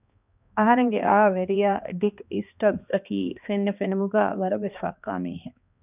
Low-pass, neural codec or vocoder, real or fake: 3.6 kHz; codec, 16 kHz, 2 kbps, X-Codec, HuBERT features, trained on balanced general audio; fake